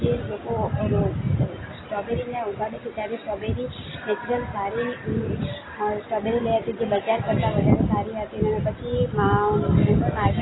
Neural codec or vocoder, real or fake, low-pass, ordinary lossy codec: none; real; 7.2 kHz; AAC, 16 kbps